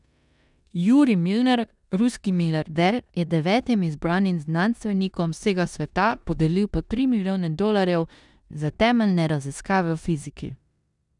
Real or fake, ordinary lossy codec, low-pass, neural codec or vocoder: fake; none; 10.8 kHz; codec, 16 kHz in and 24 kHz out, 0.9 kbps, LongCat-Audio-Codec, four codebook decoder